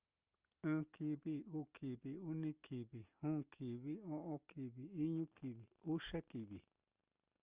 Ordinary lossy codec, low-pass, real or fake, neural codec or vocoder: Opus, 32 kbps; 3.6 kHz; real; none